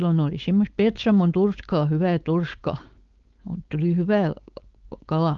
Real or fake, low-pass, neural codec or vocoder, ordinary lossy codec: fake; 7.2 kHz; codec, 16 kHz, 4.8 kbps, FACodec; Opus, 32 kbps